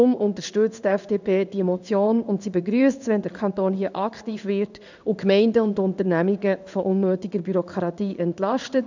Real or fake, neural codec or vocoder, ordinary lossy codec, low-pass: fake; codec, 16 kHz in and 24 kHz out, 1 kbps, XY-Tokenizer; none; 7.2 kHz